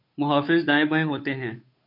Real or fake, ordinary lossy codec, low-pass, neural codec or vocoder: fake; MP3, 32 kbps; 5.4 kHz; codec, 16 kHz, 8 kbps, FunCodec, trained on Chinese and English, 25 frames a second